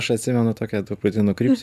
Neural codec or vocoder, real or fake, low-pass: none; real; 14.4 kHz